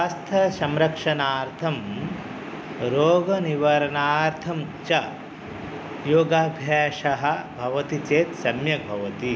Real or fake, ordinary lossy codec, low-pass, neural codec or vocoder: real; none; none; none